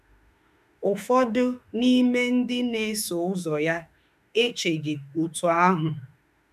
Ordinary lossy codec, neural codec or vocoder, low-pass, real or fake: none; autoencoder, 48 kHz, 32 numbers a frame, DAC-VAE, trained on Japanese speech; 14.4 kHz; fake